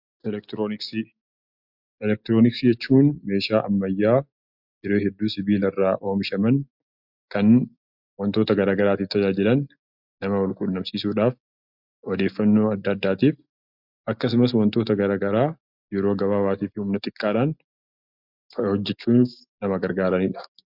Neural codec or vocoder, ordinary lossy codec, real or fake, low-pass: none; MP3, 48 kbps; real; 5.4 kHz